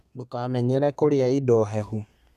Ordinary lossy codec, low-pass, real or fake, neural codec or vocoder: none; 14.4 kHz; fake; codec, 32 kHz, 1.9 kbps, SNAC